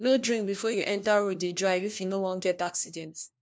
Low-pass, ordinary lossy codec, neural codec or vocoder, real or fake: none; none; codec, 16 kHz, 1 kbps, FunCodec, trained on LibriTTS, 50 frames a second; fake